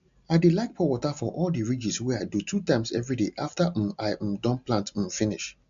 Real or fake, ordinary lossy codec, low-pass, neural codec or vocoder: real; MP3, 64 kbps; 7.2 kHz; none